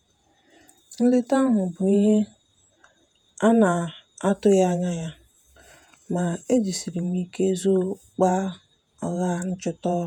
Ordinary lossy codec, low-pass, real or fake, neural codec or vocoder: none; 19.8 kHz; fake; vocoder, 48 kHz, 128 mel bands, Vocos